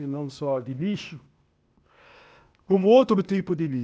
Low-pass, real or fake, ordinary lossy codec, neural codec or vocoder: none; fake; none; codec, 16 kHz, 0.8 kbps, ZipCodec